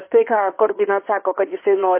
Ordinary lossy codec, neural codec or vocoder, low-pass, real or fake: MP3, 24 kbps; codec, 16 kHz in and 24 kHz out, 2.2 kbps, FireRedTTS-2 codec; 3.6 kHz; fake